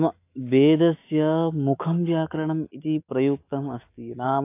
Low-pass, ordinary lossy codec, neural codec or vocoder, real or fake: 3.6 kHz; AAC, 24 kbps; autoencoder, 48 kHz, 128 numbers a frame, DAC-VAE, trained on Japanese speech; fake